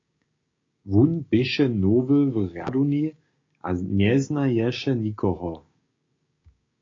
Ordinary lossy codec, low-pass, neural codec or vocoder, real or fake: AAC, 32 kbps; 7.2 kHz; codec, 16 kHz, 6 kbps, DAC; fake